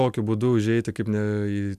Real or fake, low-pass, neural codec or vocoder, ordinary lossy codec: real; 14.4 kHz; none; MP3, 96 kbps